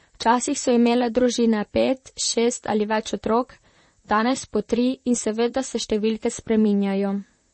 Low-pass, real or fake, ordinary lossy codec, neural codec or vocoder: 10.8 kHz; fake; MP3, 32 kbps; vocoder, 44.1 kHz, 128 mel bands, Pupu-Vocoder